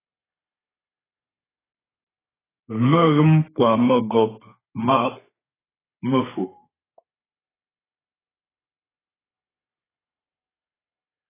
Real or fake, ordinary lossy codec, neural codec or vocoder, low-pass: fake; AAC, 16 kbps; codec, 32 kHz, 1.9 kbps, SNAC; 3.6 kHz